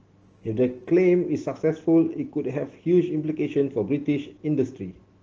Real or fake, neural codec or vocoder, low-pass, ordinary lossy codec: real; none; 7.2 kHz; Opus, 16 kbps